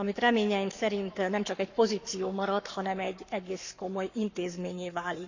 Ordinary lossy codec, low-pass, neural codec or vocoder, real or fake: none; 7.2 kHz; codec, 44.1 kHz, 7.8 kbps, Pupu-Codec; fake